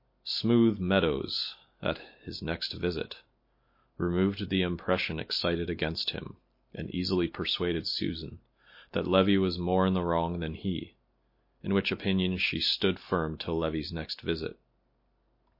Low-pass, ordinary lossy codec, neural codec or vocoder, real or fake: 5.4 kHz; MP3, 32 kbps; none; real